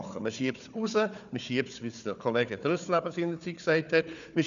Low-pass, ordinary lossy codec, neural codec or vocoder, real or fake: 7.2 kHz; none; codec, 16 kHz, 4 kbps, FunCodec, trained on Chinese and English, 50 frames a second; fake